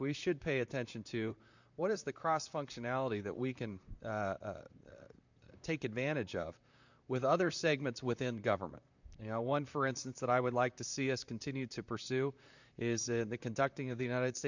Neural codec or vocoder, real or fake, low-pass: vocoder, 44.1 kHz, 128 mel bands, Pupu-Vocoder; fake; 7.2 kHz